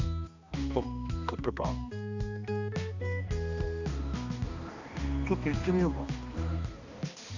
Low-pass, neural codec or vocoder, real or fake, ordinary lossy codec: 7.2 kHz; codec, 16 kHz, 2 kbps, X-Codec, HuBERT features, trained on balanced general audio; fake; none